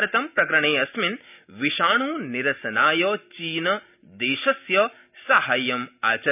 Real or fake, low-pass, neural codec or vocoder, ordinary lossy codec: real; 3.6 kHz; none; none